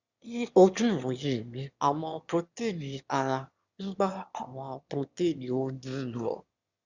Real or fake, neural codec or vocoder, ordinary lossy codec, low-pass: fake; autoencoder, 22.05 kHz, a latent of 192 numbers a frame, VITS, trained on one speaker; Opus, 64 kbps; 7.2 kHz